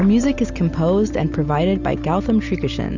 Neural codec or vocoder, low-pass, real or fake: none; 7.2 kHz; real